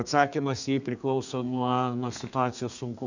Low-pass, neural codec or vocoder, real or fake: 7.2 kHz; codec, 32 kHz, 1.9 kbps, SNAC; fake